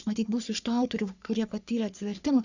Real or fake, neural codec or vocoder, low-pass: fake; codec, 44.1 kHz, 3.4 kbps, Pupu-Codec; 7.2 kHz